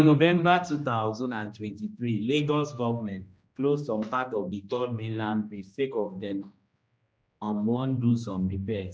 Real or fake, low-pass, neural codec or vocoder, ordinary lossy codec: fake; none; codec, 16 kHz, 1 kbps, X-Codec, HuBERT features, trained on general audio; none